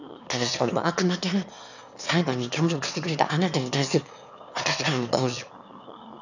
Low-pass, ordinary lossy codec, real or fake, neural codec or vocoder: 7.2 kHz; none; fake; autoencoder, 22.05 kHz, a latent of 192 numbers a frame, VITS, trained on one speaker